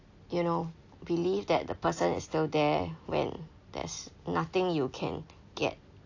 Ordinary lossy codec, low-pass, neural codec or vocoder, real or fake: AAC, 48 kbps; 7.2 kHz; none; real